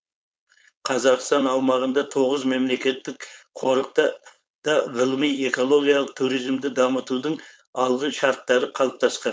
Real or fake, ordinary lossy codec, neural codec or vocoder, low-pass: fake; none; codec, 16 kHz, 4.8 kbps, FACodec; none